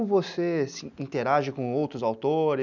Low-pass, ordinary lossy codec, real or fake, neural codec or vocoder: 7.2 kHz; none; real; none